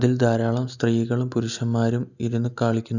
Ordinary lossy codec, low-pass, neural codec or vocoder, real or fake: none; 7.2 kHz; none; real